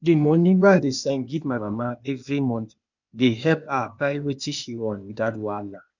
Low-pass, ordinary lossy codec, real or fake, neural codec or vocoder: 7.2 kHz; none; fake; codec, 16 kHz, 0.8 kbps, ZipCodec